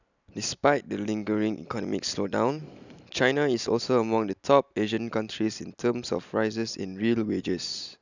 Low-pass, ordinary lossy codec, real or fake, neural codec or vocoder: 7.2 kHz; none; real; none